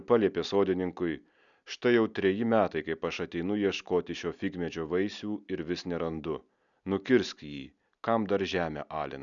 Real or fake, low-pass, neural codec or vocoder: real; 7.2 kHz; none